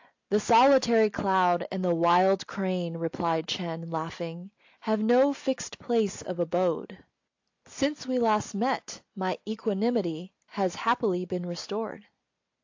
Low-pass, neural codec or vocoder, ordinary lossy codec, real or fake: 7.2 kHz; none; AAC, 48 kbps; real